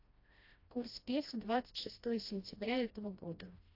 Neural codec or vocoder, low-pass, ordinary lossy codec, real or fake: codec, 16 kHz, 1 kbps, FreqCodec, smaller model; 5.4 kHz; AAC, 32 kbps; fake